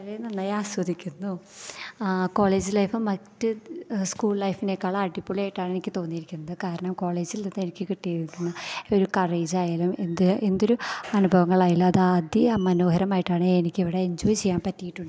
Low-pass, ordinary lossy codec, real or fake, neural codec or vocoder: none; none; real; none